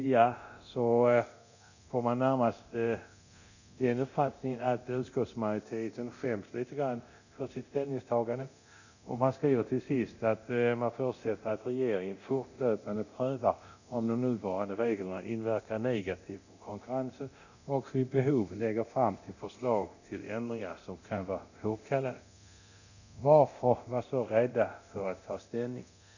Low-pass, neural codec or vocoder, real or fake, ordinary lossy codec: 7.2 kHz; codec, 24 kHz, 0.9 kbps, DualCodec; fake; none